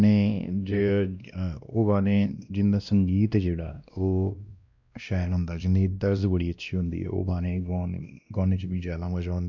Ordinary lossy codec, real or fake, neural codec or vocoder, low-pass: none; fake; codec, 16 kHz, 1 kbps, X-Codec, WavLM features, trained on Multilingual LibriSpeech; 7.2 kHz